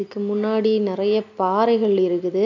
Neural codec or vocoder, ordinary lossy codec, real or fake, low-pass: none; none; real; 7.2 kHz